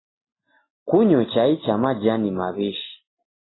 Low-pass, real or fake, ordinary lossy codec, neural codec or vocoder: 7.2 kHz; real; AAC, 16 kbps; none